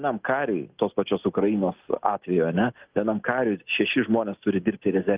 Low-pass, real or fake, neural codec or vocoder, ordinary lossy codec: 3.6 kHz; real; none; Opus, 24 kbps